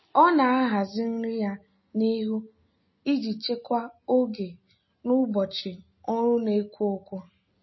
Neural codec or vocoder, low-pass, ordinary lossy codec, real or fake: none; 7.2 kHz; MP3, 24 kbps; real